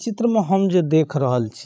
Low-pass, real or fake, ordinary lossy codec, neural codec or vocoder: none; fake; none; codec, 16 kHz, 16 kbps, FreqCodec, larger model